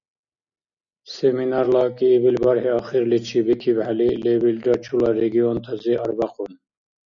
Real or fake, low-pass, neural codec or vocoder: real; 7.2 kHz; none